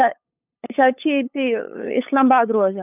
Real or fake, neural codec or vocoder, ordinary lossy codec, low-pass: fake; codec, 16 kHz, 8 kbps, FunCodec, trained on LibriTTS, 25 frames a second; none; 3.6 kHz